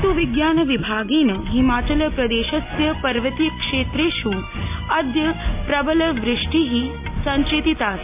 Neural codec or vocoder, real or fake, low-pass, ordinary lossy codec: none; real; 3.6 kHz; MP3, 32 kbps